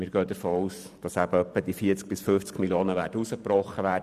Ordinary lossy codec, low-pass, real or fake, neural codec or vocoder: none; 14.4 kHz; fake; vocoder, 44.1 kHz, 128 mel bands every 512 samples, BigVGAN v2